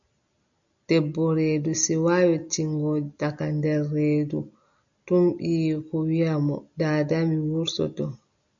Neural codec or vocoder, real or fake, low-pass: none; real; 7.2 kHz